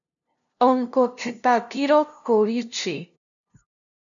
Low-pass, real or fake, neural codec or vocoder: 7.2 kHz; fake; codec, 16 kHz, 0.5 kbps, FunCodec, trained on LibriTTS, 25 frames a second